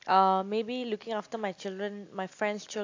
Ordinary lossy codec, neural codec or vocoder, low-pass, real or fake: AAC, 48 kbps; none; 7.2 kHz; real